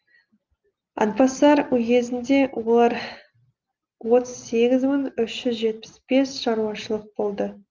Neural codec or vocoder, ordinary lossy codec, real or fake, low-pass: none; Opus, 32 kbps; real; 7.2 kHz